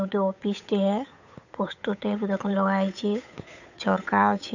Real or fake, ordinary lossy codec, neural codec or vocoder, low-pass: fake; none; codec, 16 kHz, 8 kbps, FunCodec, trained on Chinese and English, 25 frames a second; 7.2 kHz